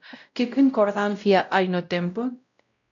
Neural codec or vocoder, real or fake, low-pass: codec, 16 kHz, 0.5 kbps, X-Codec, WavLM features, trained on Multilingual LibriSpeech; fake; 7.2 kHz